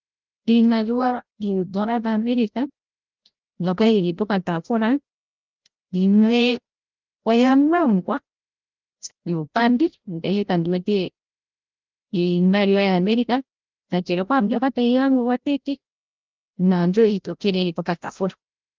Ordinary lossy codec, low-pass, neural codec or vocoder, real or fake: Opus, 32 kbps; 7.2 kHz; codec, 16 kHz, 0.5 kbps, FreqCodec, larger model; fake